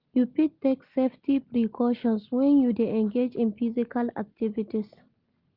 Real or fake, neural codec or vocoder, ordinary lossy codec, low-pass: real; none; Opus, 32 kbps; 5.4 kHz